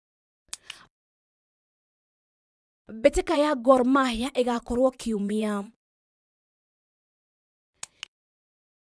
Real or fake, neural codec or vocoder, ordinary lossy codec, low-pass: fake; vocoder, 22.05 kHz, 80 mel bands, Vocos; none; none